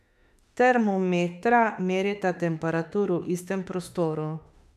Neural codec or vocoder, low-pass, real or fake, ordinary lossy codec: autoencoder, 48 kHz, 32 numbers a frame, DAC-VAE, trained on Japanese speech; 14.4 kHz; fake; none